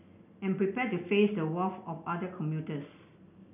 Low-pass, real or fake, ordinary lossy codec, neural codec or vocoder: 3.6 kHz; real; none; none